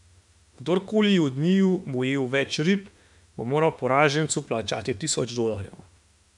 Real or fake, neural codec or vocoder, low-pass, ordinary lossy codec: fake; autoencoder, 48 kHz, 32 numbers a frame, DAC-VAE, trained on Japanese speech; 10.8 kHz; none